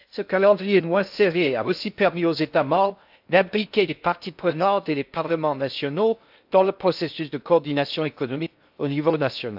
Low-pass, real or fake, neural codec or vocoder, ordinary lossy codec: 5.4 kHz; fake; codec, 16 kHz in and 24 kHz out, 0.6 kbps, FocalCodec, streaming, 2048 codes; AAC, 48 kbps